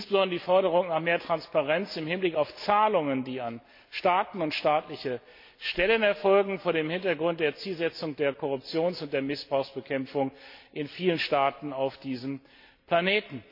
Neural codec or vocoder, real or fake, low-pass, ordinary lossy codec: none; real; 5.4 kHz; MP3, 32 kbps